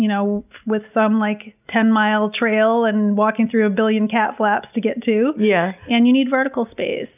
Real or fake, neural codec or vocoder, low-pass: real; none; 3.6 kHz